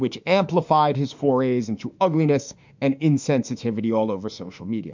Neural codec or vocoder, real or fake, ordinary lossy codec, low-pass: autoencoder, 48 kHz, 32 numbers a frame, DAC-VAE, trained on Japanese speech; fake; MP3, 64 kbps; 7.2 kHz